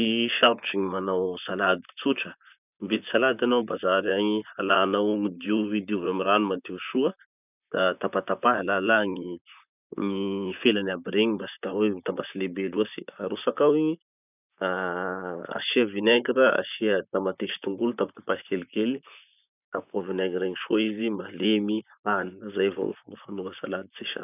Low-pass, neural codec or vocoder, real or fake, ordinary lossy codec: 3.6 kHz; vocoder, 44.1 kHz, 128 mel bands, Pupu-Vocoder; fake; none